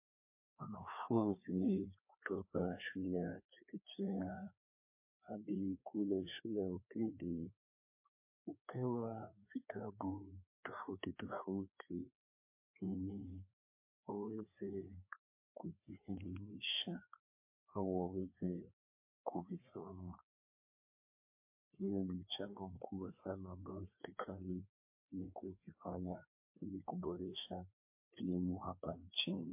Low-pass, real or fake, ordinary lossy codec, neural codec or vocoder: 3.6 kHz; fake; MP3, 24 kbps; codec, 16 kHz, 2 kbps, FreqCodec, larger model